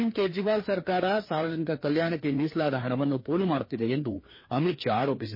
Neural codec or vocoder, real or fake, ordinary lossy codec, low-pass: codec, 16 kHz, 2 kbps, FreqCodec, larger model; fake; MP3, 24 kbps; 5.4 kHz